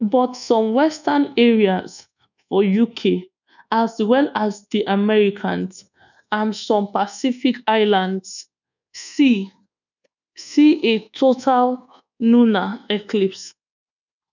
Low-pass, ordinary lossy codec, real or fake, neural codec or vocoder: 7.2 kHz; none; fake; codec, 24 kHz, 1.2 kbps, DualCodec